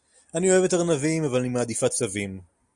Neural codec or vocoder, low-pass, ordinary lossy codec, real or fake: none; 10.8 kHz; Opus, 64 kbps; real